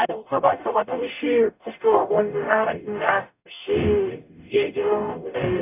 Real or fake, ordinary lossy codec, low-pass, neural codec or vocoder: fake; none; 3.6 kHz; codec, 44.1 kHz, 0.9 kbps, DAC